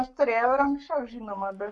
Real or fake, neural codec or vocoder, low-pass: fake; codec, 44.1 kHz, 7.8 kbps, Pupu-Codec; 10.8 kHz